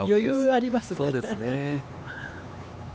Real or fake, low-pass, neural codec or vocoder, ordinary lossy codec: fake; none; codec, 16 kHz, 4 kbps, X-Codec, HuBERT features, trained on LibriSpeech; none